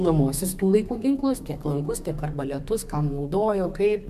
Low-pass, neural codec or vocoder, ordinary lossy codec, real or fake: 14.4 kHz; codec, 32 kHz, 1.9 kbps, SNAC; AAC, 96 kbps; fake